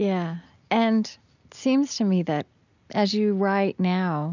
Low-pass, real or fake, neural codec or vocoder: 7.2 kHz; real; none